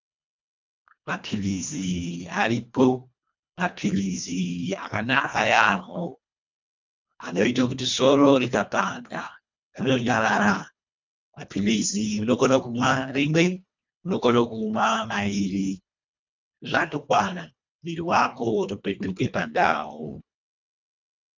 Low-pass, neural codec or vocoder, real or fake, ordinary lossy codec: 7.2 kHz; codec, 24 kHz, 1.5 kbps, HILCodec; fake; MP3, 64 kbps